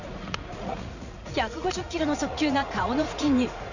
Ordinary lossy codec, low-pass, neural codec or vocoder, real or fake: none; 7.2 kHz; vocoder, 44.1 kHz, 80 mel bands, Vocos; fake